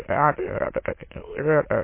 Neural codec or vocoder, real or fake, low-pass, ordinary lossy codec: autoencoder, 22.05 kHz, a latent of 192 numbers a frame, VITS, trained on many speakers; fake; 3.6 kHz; MP3, 24 kbps